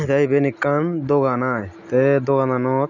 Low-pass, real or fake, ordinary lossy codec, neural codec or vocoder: 7.2 kHz; real; none; none